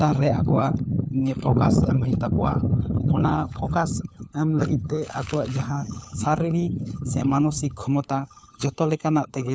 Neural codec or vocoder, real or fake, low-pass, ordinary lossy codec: codec, 16 kHz, 4 kbps, FunCodec, trained on LibriTTS, 50 frames a second; fake; none; none